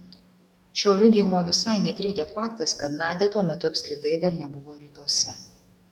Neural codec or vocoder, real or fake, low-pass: codec, 44.1 kHz, 2.6 kbps, DAC; fake; 19.8 kHz